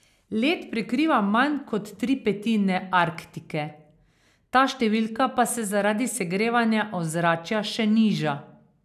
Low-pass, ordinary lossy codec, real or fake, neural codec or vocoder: 14.4 kHz; none; real; none